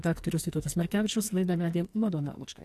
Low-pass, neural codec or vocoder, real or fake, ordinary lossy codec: 14.4 kHz; codec, 44.1 kHz, 2.6 kbps, SNAC; fake; AAC, 64 kbps